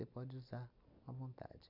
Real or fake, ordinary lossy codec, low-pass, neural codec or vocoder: real; none; 5.4 kHz; none